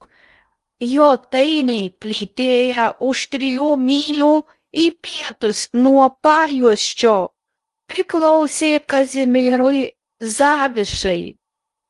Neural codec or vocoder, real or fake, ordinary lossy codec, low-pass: codec, 16 kHz in and 24 kHz out, 0.6 kbps, FocalCodec, streaming, 2048 codes; fake; Opus, 32 kbps; 10.8 kHz